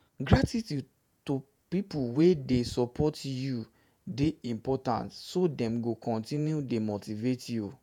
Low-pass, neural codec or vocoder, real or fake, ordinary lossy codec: 19.8 kHz; vocoder, 48 kHz, 128 mel bands, Vocos; fake; none